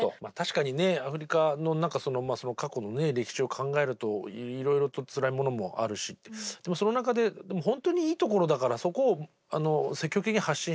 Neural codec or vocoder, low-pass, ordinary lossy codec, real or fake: none; none; none; real